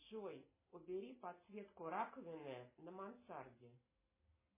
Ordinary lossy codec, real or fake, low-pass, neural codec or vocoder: MP3, 16 kbps; real; 3.6 kHz; none